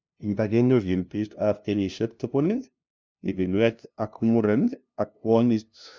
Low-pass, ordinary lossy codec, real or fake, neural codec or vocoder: none; none; fake; codec, 16 kHz, 0.5 kbps, FunCodec, trained on LibriTTS, 25 frames a second